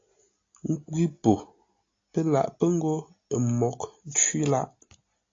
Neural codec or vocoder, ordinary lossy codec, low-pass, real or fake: none; AAC, 32 kbps; 7.2 kHz; real